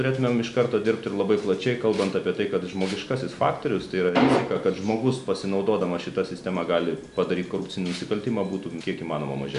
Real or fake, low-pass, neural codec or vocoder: real; 10.8 kHz; none